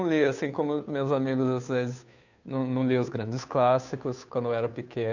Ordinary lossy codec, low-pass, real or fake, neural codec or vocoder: none; 7.2 kHz; fake; codec, 16 kHz, 2 kbps, FunCodec, trained on Chinese and English, 25 frames a second